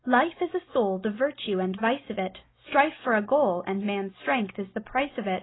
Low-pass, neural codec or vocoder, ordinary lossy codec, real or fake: 7.2 kHz; none; AAC, 16 kbps; real